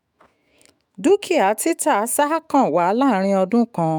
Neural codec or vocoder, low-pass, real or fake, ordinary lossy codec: autoencoder, 48 kHz, 128 numbers a frame, DAC-VAE, trained on Japanese speech; none; fake; none